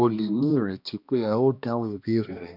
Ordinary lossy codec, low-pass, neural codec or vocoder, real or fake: none; 5.4 kHz; codec, 16 kHz, 1 kbps, X-Codec, HuBERT features, trained on balanced general audio; fake